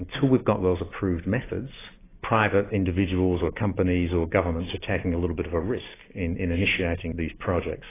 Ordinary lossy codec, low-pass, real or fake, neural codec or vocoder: AAC, 16 kbps; 3.6 kHz; real; none